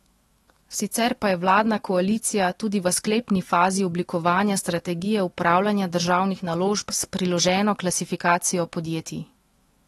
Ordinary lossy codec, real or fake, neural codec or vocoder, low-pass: AAC, 32 kbps; fake; autoencoder, 48 kHz, 128 numbers a frame, DAC-VAE, trained on Japanese speech; 19.8 kHz